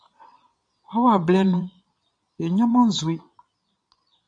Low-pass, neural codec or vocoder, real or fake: 9.9 kHz; vocoder, 22.05 kHz, 80 mel bands, Vocos; fake